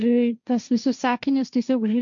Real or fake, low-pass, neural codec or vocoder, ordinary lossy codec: fake; 7.2 kHz; codec, 16 kHz, 1.1 kbps, Voila-Tokenizer; MP3, 64 kbps